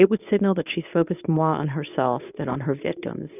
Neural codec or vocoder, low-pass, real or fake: codec, 24 kHz, 0.9 kbps, WavTokenizer, medium speech release version 1; 3.6 kHz; fake